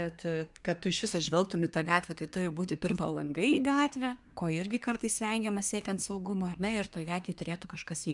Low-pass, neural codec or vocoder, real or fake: 10.8 kHz; codec, 24 kHz, 1 kbps, SNAC; fake